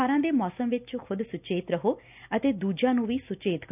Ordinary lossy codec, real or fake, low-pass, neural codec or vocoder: none; real; 3.6 kHz; none